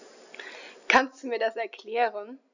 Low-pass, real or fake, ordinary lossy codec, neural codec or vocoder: 7.2 kHz; real; none; none